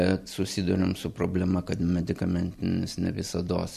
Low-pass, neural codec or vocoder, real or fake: 14.4 kHz; vocoder, 44.1 kHz, 128 mel bands every 256 samples, BigVGAN v2; fake